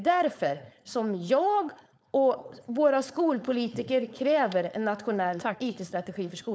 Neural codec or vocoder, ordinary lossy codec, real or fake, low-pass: codec, 16 kHz, 4.8 kbps, FACodec; none; fake; none